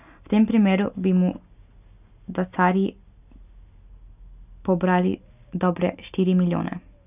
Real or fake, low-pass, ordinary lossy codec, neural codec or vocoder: real; 3.6 kHz; none; none